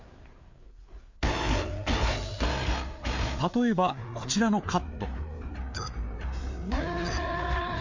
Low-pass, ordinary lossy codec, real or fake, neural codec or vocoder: 7.2 kHz; MP3, 48 kbps; fake; codec, 16 kHz, 4 kbps, FreqCodec, larger model